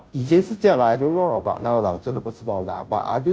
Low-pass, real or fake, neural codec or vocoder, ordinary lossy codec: none; fake; codec, 16 kHz, 0.5 kbps, FunCodec, trained on Chinese and English, 25 frames a second; none